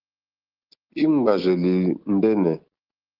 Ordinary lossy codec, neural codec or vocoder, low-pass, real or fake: Opus, 16 kbps; none; 5.4 kHz; real